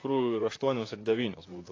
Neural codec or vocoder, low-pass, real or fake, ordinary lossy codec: vocoder, 44.1 kHz, 128 mel bands, Pupu-Vocoder; 7.2 kHz; fake; AAC, 32 kbps